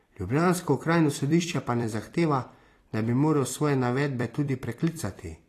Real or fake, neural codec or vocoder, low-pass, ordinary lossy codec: fake; vocoder, 44.1 kHz, 128 mel bands every 256 samples, BigVGAN v2; 14.4 kHz; AAC, 48 kbps